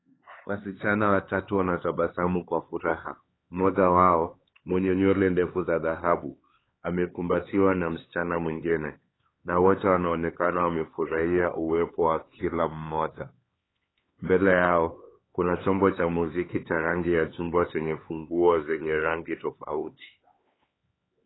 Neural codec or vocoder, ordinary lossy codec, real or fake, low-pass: codec, 16 kHz, 4 kbps, X-Codec, HuBERT features, trained on LibriSpeech; AAC, 16 kbps; fake; 7.2 kHz